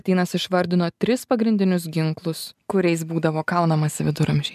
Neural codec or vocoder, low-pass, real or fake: none; 14.4 kHz; real